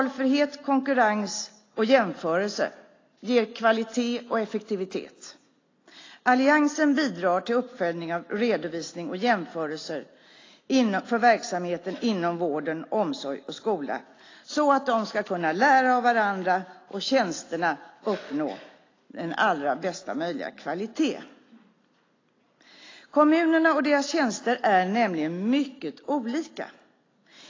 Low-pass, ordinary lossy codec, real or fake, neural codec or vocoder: 7.2 kHz; AAC, 32 kbps; real; none